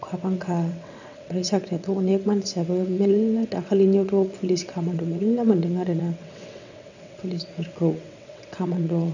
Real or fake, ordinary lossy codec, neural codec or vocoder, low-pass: fake; none; vocoder, 22.05 kHz, 80 mel bands, WaveNeXt; 7.2 kHz